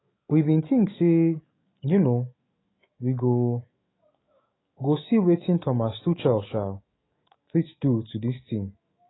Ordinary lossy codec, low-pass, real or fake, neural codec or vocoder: AAC, 16 kbps; 7.2 kHz; fake; autoencoder, 48 kHz, 128 numbers a frame, DAC-VAE, trained on Japanese speech